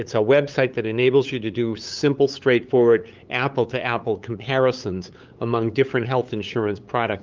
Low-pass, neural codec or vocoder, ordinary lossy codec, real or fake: 7.2 kHz; codec, 16 kHz, 8 kbps, FunCodec, trained on LibriTTS, 25 frames a second; Opus, 24 kbps; fake